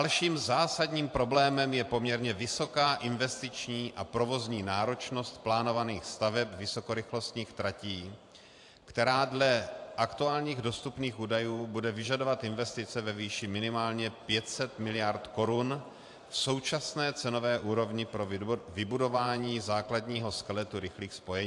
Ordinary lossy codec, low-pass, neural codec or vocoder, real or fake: AAC, 64 kbps; 10.8 kHz; vocoder, 44.1 kHz, 128 mel bands every 512 samples, BigVGAN v2; fake